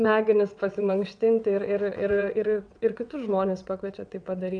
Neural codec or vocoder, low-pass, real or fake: vocoder, 22.05 kHz, 80 mel bands, WaveNeXt; 9.9 kHz; fake